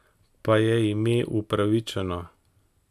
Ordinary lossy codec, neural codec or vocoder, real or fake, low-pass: AAC, 96 kbps; none; real; 14.4 kHz